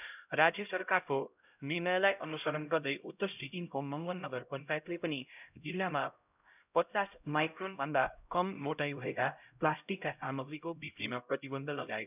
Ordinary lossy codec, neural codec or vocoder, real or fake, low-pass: none; codec, 16 kHz, 0.5 kbps, X-Codec, HuBERT features, trained on LibriSpeech; fake; 3.6 kHz